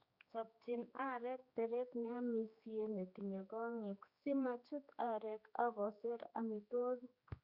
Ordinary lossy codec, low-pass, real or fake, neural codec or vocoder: Opus, 64 kbps; 5.4 kHz; fake; codec, 16 kHz, 2 kbps, X-Codec, HuBERT features, trained on general audio